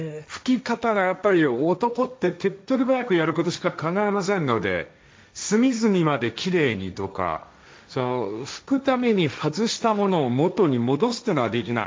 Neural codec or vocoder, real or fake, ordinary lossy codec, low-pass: codec, 16 kHz, 1.1 kbps, Voila-Tokenizer; fake; none; none